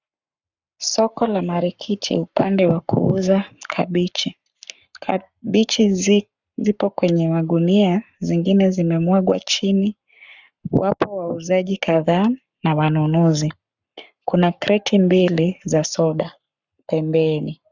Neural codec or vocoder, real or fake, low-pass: codec, 44.1 kHz, 7.8 kbps, Pupu-Codec; fake; 7.2 kHz